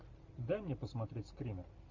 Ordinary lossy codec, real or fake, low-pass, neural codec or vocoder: MP3, 64 kbps; real; 7.2 kHz; none